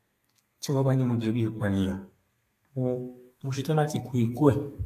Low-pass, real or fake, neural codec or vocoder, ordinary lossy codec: 14.4 kHz; fake; codec, 32 kHz, 1.9 kbps, SNAC; AAC, 64 kbps